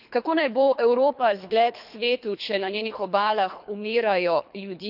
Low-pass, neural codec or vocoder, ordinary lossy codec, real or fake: 5.4 kHz; codec, 24 kHz, 3 kbps, HILCodec; none; fake